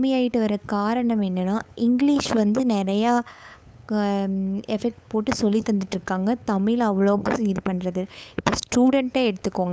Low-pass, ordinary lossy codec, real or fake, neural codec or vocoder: none; none; fake; codec, 16 kHz, 8 kbps, FunCodec, trained on LibriTTS, 25 frames a second